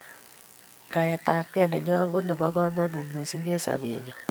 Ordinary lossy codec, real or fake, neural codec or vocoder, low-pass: none; fake; codec, 44.1 kHz, 2.6 kbps, SNAC; none